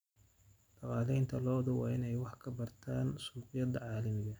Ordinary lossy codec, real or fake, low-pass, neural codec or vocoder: none; real; none; none